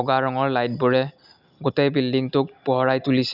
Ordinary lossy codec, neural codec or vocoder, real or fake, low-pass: none; none; real; 5.4 kHz